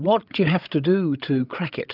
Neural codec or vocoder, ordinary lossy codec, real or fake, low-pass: codec, 16 kHz, 16 kbps, FreqCodec, larger model; Opus, 24 kbps; fake; 5.4 kHz